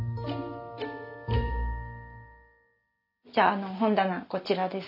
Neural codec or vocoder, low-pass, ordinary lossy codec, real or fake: none; 5.4 kHz; none; real